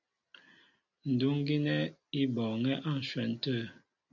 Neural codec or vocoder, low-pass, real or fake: none; 7.2 kHz; real